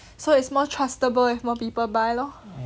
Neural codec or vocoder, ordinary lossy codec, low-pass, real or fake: none; none; none; real